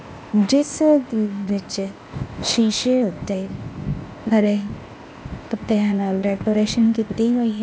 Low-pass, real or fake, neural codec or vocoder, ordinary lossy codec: none; fake; codec, 16 kHz, 0.8 kbps, ZipCodec; none